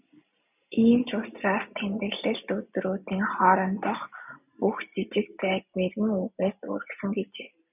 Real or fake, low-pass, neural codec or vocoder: real; 3.6 kHz; none